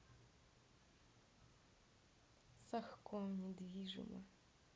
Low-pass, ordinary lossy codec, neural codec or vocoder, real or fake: none; none; none; real